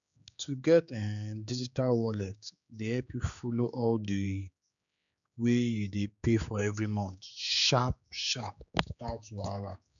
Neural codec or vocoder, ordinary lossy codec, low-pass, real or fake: codec, 16 kHz, 4 kbps, X-Codec, HuBERT features, trained on general audio; none; 7.2 kHz; fake